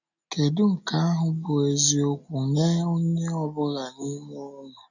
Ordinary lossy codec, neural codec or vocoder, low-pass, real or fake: none; none; 7.2 kHz; real